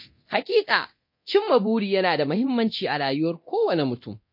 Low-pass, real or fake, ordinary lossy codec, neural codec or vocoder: 5.4 kHz; fake; MP3, 32 kbps; codec, 24 kHz, 0.9 kbps, DualCodec